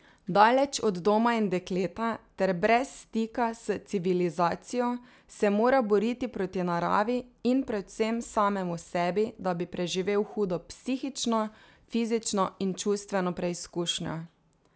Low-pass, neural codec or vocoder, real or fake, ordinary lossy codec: none; none; real; none